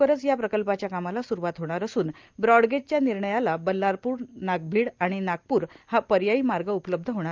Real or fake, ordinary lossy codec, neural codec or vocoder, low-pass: real; Opus, 32 kbps; none; 7.2 kHz